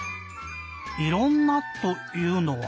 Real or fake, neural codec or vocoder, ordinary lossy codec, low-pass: real; none; none; none